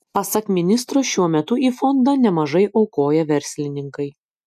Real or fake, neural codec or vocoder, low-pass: real; none; 14.4 kHz